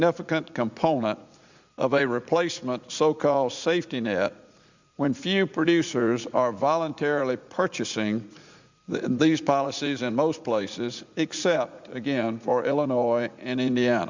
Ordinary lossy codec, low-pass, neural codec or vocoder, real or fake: Opus, 64 kbps; 7.2 kHz; vocoder, 44.1 kHz, 80 mel bands, Vocos; fake